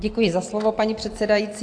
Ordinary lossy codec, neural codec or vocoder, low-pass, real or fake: AAC, 48 kbps; none; 9.9 kHz; real